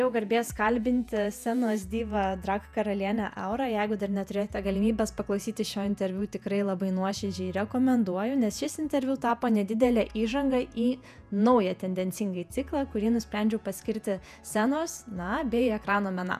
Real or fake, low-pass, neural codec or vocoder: fake; 14.4 kHz; vocoder, 48 kHz, 128 mel bands, Vocos